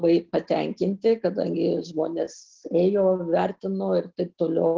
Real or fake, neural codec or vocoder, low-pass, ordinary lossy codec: real; none; 7.2 kHz; Opus, 16 kbps